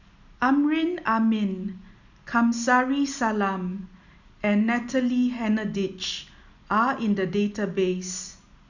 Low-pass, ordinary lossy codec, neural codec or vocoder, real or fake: 7.2 kHz; none; none; real